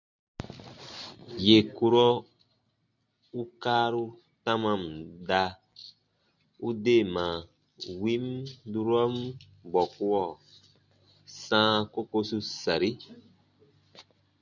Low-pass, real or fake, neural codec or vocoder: 7.2 kHz; real; none